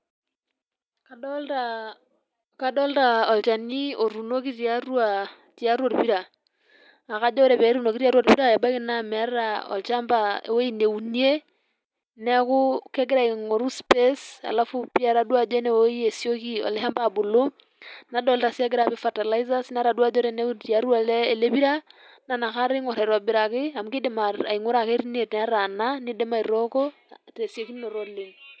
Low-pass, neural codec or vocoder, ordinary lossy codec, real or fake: none; none; none; real